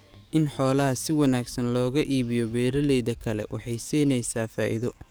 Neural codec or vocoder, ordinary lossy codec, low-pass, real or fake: codec, 44.1 kHz, 7.8 kbps, DAC; none; none; fake